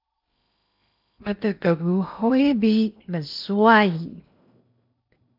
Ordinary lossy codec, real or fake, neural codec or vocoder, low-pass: MP3, 48 kbps; fake; codec, 16 kHz in and 24 kHz out, 0.8 kbps, FocalCodec, streaming, 65536 codes; 5.4 kHz